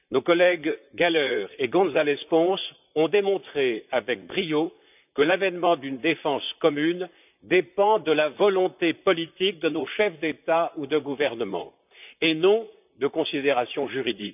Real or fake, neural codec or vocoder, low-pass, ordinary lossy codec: fake; vocoder, 44.1 kHz, 128 mel bands, Pupu-Vocoder; 3.6 kHz; none